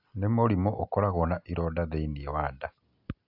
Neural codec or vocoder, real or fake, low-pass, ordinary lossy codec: none; real; 5.4 kHz; AAC, 48 kbps